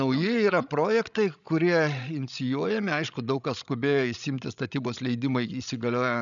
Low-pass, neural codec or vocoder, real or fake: 7.2 kHz; codec, 16 kHz, 16 kbps, FreqCodec, larger model; fake